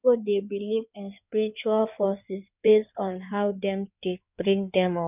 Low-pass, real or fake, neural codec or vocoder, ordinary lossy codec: 3.6 kHz; fake; codec, 16 kHz in and 24 kHz out, 2.2 kbps, FireRedTTS-2 codec; none